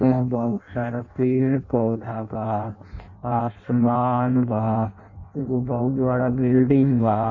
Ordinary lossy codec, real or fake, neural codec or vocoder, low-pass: none; fake; codec, 16 kHz in and 24 kHz out, 0.6 kbps, FireRedTTS-2 codec; 7.2 kHz